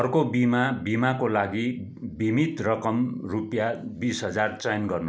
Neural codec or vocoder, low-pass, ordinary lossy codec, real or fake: none; none; none; real